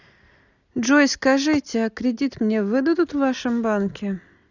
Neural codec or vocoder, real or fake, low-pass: none; real; 7.2 kHz